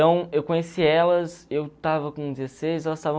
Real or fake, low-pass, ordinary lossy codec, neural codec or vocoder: real; none; none; none